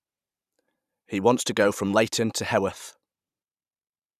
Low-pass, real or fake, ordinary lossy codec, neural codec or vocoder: 14.4 kHz; fake; none; vocoder, 44.1 kHz, 128 mel bands every 512 samples, BigVGAN v2